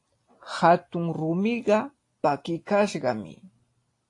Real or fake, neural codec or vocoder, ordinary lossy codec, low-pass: real; none; AAC, 48 kbps; 10.8 kHz